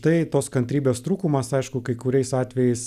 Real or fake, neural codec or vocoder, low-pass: real; none; 14.4 kHz